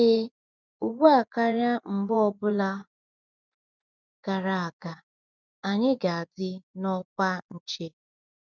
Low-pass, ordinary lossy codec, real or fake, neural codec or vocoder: 7.2 kHz; none; fake; vocoder, 24 kHz, 100 mel bands, Vocos